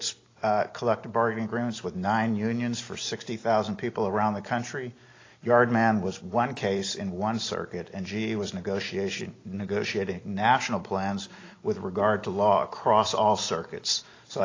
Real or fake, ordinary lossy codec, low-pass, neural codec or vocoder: real; AAC, 32 kbps; 7.2 kHz; none